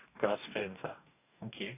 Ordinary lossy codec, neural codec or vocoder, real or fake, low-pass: none; codec, 44.1 kHz, 2.6 kbps, DAC; fake; 3.6 kHz